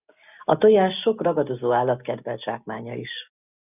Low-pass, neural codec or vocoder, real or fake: 3.6 kHz; none; real